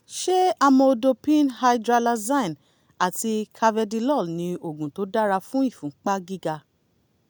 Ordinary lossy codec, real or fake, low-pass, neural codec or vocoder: none; real; none; none